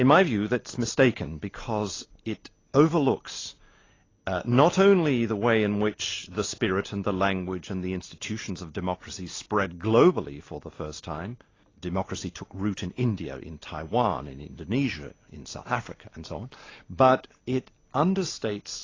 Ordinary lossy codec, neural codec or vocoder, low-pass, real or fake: AAC, 32 kbps; none; 7.2 kHz; real